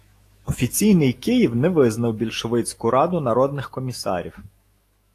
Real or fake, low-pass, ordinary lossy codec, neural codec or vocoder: fake; 14.4 kHz; AAC, 48 kbps; autoencoder, 48 kHz, 128 numbers a frame, DAC-VAE, trained on Japanese speech